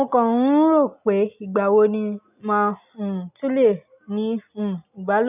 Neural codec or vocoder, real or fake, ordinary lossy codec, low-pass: none; real; none; 3.6 kHz